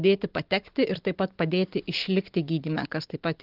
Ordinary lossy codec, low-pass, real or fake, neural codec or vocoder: Opus, 16 kbps; 5.4 kHz; real; none